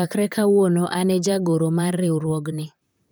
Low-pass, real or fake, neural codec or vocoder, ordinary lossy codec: none; fake; vocoder, 44.1 kHz, 128 mel bands, Pupu-Vocoder; none